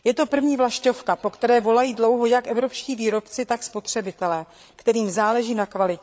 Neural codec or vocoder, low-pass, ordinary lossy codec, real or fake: codec, 16 kHz, 8 kbps, FreqCodec, larger model; none; none; fake